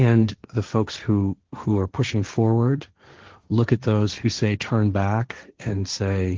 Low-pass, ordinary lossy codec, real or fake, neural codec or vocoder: 7.2 kHz; Opus, 16 kbps; fake; codec, 16 kHz, 1.1 kbps, Voila-Tokenizer